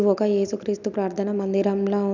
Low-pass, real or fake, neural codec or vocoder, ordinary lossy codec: 7.2 kHz; real; none; none